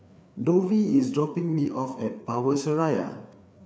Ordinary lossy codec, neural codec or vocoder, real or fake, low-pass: none; codec, 16 kHz, 4 kbps, FreqCodec, larger model; fake; none